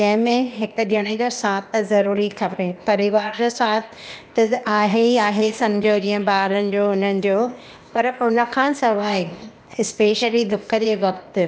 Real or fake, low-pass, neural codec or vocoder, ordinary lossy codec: fake; none; codec, 16 kHz, 0.8 kbps, ZipCodec; none